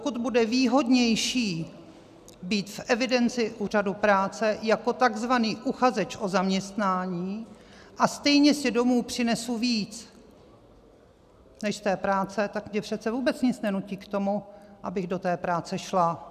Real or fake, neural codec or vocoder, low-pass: real; none; 14.4 kHz